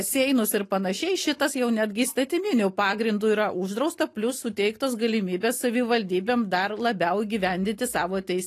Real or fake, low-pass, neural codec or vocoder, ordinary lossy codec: real; 14.4 kHz; none; AAC, 48 kbps